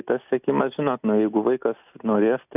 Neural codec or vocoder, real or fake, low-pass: none; real; 3.6 kHz